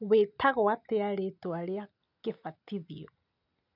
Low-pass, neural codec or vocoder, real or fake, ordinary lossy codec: 5.4 kHz; none; real; none